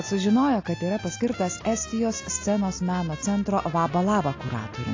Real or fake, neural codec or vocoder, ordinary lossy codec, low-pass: real; none; AAC, 32 kbps; 7.2 kHz